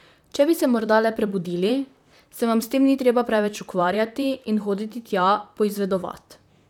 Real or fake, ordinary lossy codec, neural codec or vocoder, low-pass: fake; none; vocoder, 44.1 kHz, 128 mel bands, Pupu-Vocoder; 19.8 kHz